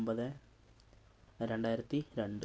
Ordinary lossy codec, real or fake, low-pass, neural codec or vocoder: none; real; none; none